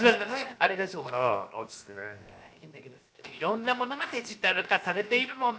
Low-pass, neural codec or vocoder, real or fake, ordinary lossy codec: none; codec, 16 kHz, 0.7 kbps, FocalCodec; fake; none